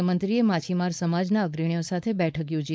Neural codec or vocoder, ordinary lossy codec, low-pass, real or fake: codec, 16 kHz, 4.8 kbps, FACodec; none; none; fake